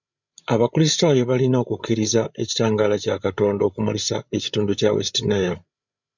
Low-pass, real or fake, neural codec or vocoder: 7.2 kHz; fake; codec, 16 kHz, 8 kbps, FreqCodec, larger model